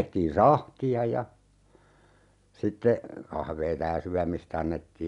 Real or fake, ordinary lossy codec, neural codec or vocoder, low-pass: real; none; none; 10.8 kHz